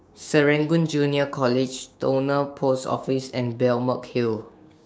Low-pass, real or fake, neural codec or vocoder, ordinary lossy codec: none; fake; codec, 16 kHz, 6 kbps, DAC; none